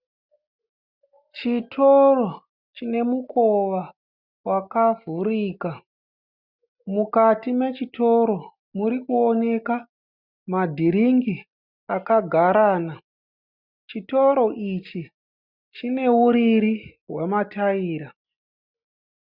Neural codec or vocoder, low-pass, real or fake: none; 5.4 kHz; real